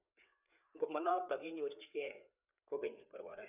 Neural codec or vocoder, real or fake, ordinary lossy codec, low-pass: codec, 16 kHz, 4 kbps, FreqCodec, larger model; fake; none; 3.6 kHz